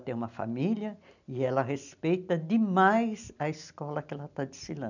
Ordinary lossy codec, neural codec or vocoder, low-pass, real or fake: none; none; 7.2 kHz; real